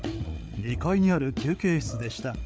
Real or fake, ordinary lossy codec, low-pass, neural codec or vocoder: fake; none; none; codec, 16 kHz, 8 kbps, FreqCodec, larger model